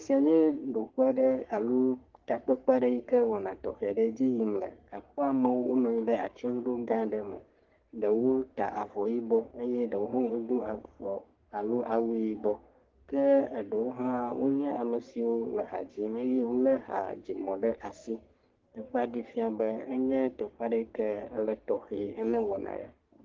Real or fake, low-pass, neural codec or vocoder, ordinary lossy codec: fake; 7.2 kHz; codec, 44.1 kHz, 3.4 kbps, Pupu-Codec; Opus, 16 kbps